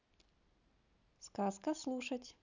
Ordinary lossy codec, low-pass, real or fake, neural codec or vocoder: none; 7.2 kHz; real; none